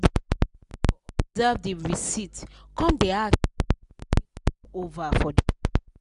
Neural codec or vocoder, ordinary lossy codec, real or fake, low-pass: none; none; real; 10.8 kHz